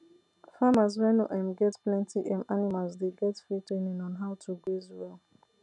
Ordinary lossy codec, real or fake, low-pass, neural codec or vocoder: none; real; 10.8 kHz; none